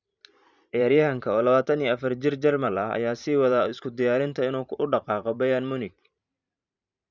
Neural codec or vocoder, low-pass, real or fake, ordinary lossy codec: vocoder, 44.1 kHz, 128 mel bands every 512 samples, BigVGAN v2; 7.2 kHz; fake; none